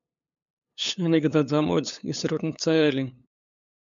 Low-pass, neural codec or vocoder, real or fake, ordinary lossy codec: 7.2 kHz; codec, 16 kHz, 8 kbps, FunCodec, trained on LibriTTS, 25 frames a second; fake; MP3, 64 kbps